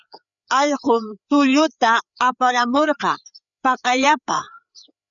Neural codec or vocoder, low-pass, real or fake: codec, 16 kHz, 4 kbps, FreqCodec, larger model; 7.2 kHz; fake